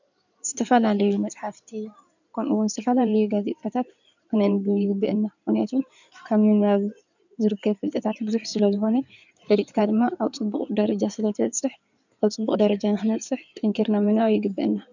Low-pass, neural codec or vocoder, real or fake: 7.2 kHz; codec, 16 kHz in and 24 kHz out, 2.2 kbps, FireRedTTS-2 codec; fake